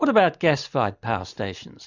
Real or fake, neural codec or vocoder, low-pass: real; none; 7.2 kHz